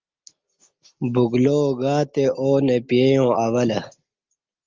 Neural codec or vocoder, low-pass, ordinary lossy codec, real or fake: none; 7.2 kHz; Opus, 24 kbps; real